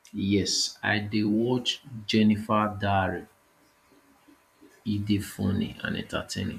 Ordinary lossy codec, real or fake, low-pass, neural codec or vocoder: none; fake; 14.4 kHz; vocoder, 44.1 kHz, 128 mel bands every 512 samples, BigVGAN v2